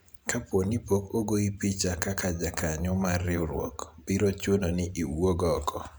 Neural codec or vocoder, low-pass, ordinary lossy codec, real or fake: vocoder, 44.1 kHz, 128 mel bands every 512 samples, BigVGAN v2; none; none; fake